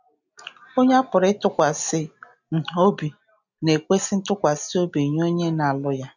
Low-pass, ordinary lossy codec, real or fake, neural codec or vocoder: 7.2 kHz; none; real; none